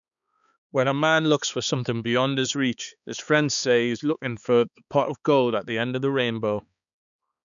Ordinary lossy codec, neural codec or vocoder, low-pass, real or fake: none; codec, 16 kHz, 4 kbps, X-Codec, HuBERT features, trained on balanced general audio; 7.2 kHz; fake